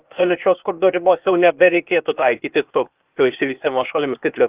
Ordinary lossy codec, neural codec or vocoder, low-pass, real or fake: Opus, 24 kbps; codec, 16 kHz, 0.8 kbps, ZipCodec; 3.6 kHz; fake